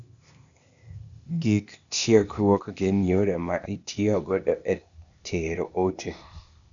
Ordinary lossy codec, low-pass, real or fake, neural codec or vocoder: MP3, 96 kbps; 7.2 kHz; fake; codec, 16 kHz, 0.8 kbps, ZipCodec